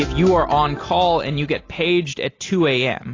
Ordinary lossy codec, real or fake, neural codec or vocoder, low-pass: AAC, 32 kbps; real; none; 7.2 kHz